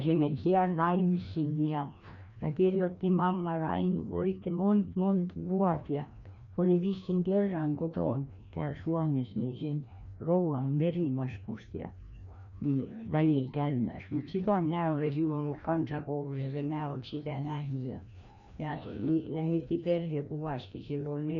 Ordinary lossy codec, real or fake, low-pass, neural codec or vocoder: none; fake; 7.2 kHz; codec, 16 kHz, 1 kbps, FreqCodec, larger model